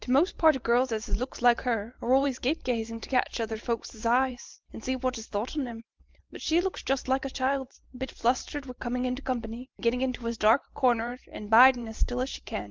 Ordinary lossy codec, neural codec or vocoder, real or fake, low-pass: Opus, 32 kbps; vocoder, 44.1 kHz, 128 mel bands every 512 samples, BigVGAN v2; fake; 7.2 kHz